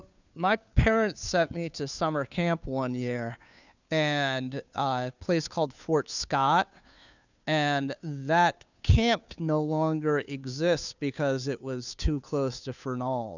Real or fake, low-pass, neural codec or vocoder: fake; 7.2 kHz; codec, 16 kHz, 2 kbps, FunCodec, trained on Chinese and English, 25 frames a second